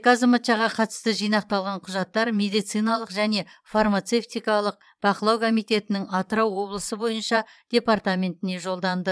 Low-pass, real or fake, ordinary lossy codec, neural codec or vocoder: none; fake; none; vocoder, 22.05 kHz, 80 mel bands, Vocos